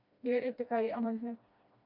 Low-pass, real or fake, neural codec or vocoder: 5.4 kHz; fake; codec, 16 kHz, 1 kbps, FreqCodec, smaller model